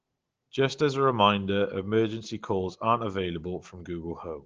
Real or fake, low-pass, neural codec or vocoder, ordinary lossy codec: real; 7.2 kHz; none; Opus, 16 kbps